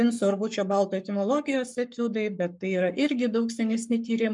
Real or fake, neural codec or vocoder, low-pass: fake; codec, 44.1 kHz, 7.8 kbps, Pupu-Codec; 10.8 kHz